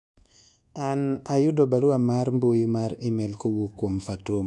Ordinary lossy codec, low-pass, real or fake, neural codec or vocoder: none; 10.8 kHz; fake; codec, 24 kHz, 1.2 kbps, DualCodec